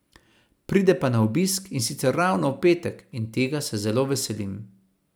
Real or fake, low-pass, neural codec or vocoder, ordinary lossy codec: fake; none; vocoder, 44.1 kHz, 128 mel bands every 256 samples, BigVGAN v2; none